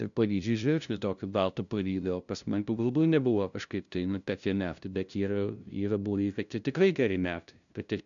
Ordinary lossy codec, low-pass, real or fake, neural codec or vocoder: MP3, 96 kbps; 7.2 kHz; fake; codec, 16 kHz, 0.5 kbps, FunCodec, trained on LibriTTS, 25 frames a second